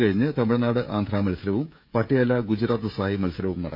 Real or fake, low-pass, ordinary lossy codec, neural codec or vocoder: fake; 5.4 kHz; none; codec, 16 kHz, 16 kbps, FreqCodec, smaller model